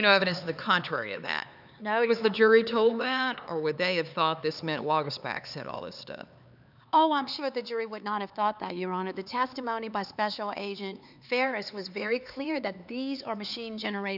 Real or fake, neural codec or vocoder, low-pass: fake; codec, 16 kHz, 4 kbps, X-Codec, HuBERT features, trained on LibriSpeech; 5.4 kHz